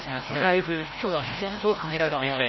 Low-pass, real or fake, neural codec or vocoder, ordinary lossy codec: 7.2 kHz; fake; codec, 16 kHz, 0.5 kbps, FreqCodec, larger model; MP3, 24 kbps